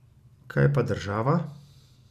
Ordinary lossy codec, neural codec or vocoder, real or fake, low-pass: none; none; real; 14.4 kHz